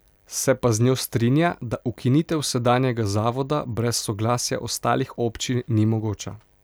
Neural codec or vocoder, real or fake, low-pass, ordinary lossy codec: none; real; none; none